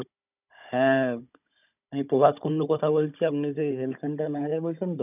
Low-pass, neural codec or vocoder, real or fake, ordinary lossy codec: 3.6 kHz; codec, 16 kHz, 16 kbps, FunCodec, trained on Chinese and English, 50 frames a second; fake; none